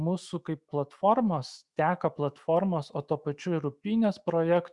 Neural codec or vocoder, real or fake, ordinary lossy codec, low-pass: autoencoder, 48 kHz, 128 numbers a frame, DAC-VAE, trained on Japanese speech; fake; Opus, 64 kbps; 10.8 kHz